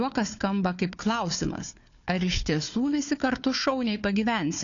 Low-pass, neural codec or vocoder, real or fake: 7.2 kHz; codec, 16 kHz, 4 kbps, FunCodec, trained on Chinese and English, 50 frames a second; fake